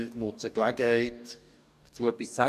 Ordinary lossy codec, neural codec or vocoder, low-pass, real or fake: none; codec, 44.1 kHz, 2.6 kbps, DAC; 14.4 kHz; fake